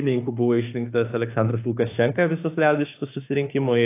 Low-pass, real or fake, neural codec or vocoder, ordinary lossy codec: 3.6 kHz; fake; autoencoder, 48 kHz, 32 numbers a frame, DAC-VAE, trained on Japanese speech; AAC, 24 kbps